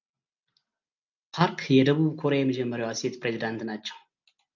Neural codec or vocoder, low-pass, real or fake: none; 7.2 kHz; real